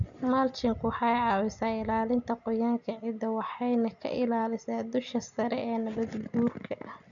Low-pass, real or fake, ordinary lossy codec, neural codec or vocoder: 7.2 kHz; real; none; none